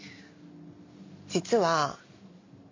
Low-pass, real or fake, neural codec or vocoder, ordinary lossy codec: 7.2 kHz; real; none; MP3, 64 kbps